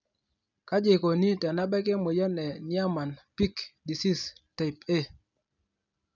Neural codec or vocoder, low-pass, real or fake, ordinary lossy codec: none; 7.2 kHz; real; none